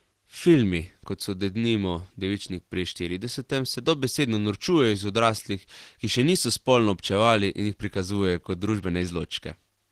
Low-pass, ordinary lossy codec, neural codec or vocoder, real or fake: 14.4 kHz; Opus, 16 kbps; none; real